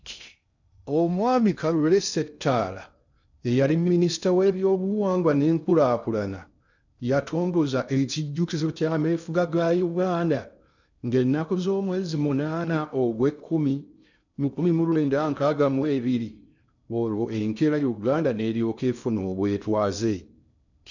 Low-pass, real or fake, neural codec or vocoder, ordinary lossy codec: 7.2 kHz; fake; codec, 16 kHz in and 24 kHz out, 0.6 kbps, FocalCodec, streaming, 2048 codes; none